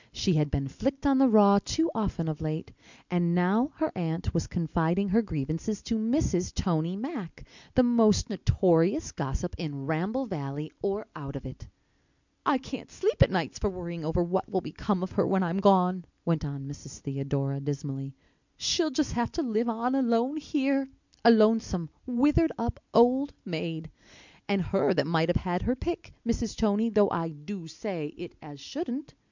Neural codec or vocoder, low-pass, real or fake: none; 7.2 kHz; real